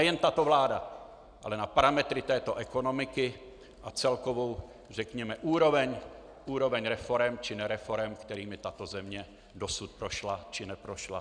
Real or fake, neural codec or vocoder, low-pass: real; none; 9.9 kHz